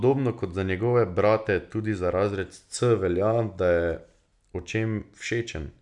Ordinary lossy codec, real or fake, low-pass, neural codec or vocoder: none; real; 10.8 kHz; none